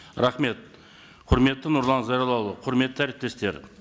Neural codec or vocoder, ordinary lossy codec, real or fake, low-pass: none; none; real; none